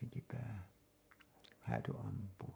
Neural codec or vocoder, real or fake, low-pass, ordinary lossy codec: codec, 44.1 kHz, 7.8 kbps, Pupu-Codec; fake; none; none